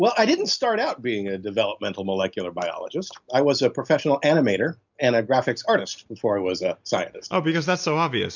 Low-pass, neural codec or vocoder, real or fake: 7.2 kHz; none; real